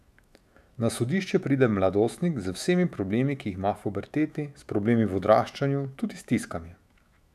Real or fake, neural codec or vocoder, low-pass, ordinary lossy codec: fake; autoencoder, 48 kHz, 128 numbers a frame, DAC-VAE, trained on Japanese speech; 14.4 kHz; none